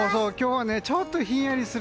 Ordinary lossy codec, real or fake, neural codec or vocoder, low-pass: none; real; none; none